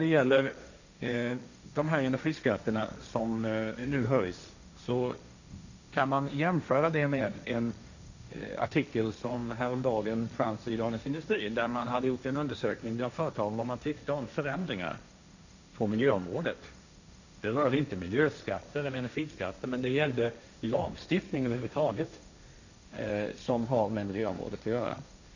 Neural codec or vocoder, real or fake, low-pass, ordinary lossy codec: codec, 16 kHz, 1.1 kbps, Voila-Tokenizer; fake; 7.2 kHz; none